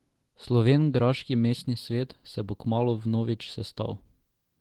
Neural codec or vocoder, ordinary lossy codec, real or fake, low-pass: autoencoder, 48 kHz, 128 numbers a frame, DAC-VAE, trained on Japanese speech; Opus, 16 kbps; fake; 19.8 kHz